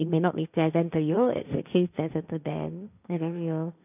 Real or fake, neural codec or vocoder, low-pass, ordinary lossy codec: fake; codec, 16 kHz, 1.1 kbps, Voila-Tokenizer; 3.6 kHz; none